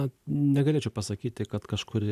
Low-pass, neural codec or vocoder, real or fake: 14.4 kHz; none; real